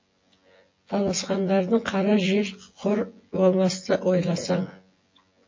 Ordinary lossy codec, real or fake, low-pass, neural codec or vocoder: MP3, 32 kbps; fake; 7.2 kHz; vocoder, 24 kHz, 100 mel bands, Vocos